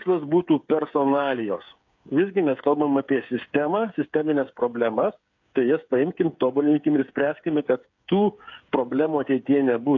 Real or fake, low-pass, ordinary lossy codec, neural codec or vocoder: fake; 7.2 kHz; AAC, 48 kbps; codec, 16 kHz, 8 kbps, FreqCodec, smaller model